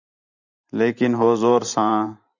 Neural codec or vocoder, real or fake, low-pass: vocoder, 44.1 kHz, 128 mel bands every 512 samples, BigVGAN v2; fake; 7.2 kHz